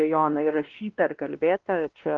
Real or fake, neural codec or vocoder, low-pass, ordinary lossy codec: fake; codec, 16 kHz, 1 kbps, X-Codec, WavLM features, trained on Multilingual LibriSpeech; 7.2 kHz; Opus, 16 kbps